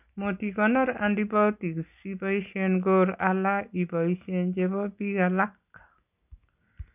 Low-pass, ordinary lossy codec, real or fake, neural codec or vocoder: 3.6 kHz; none; real; none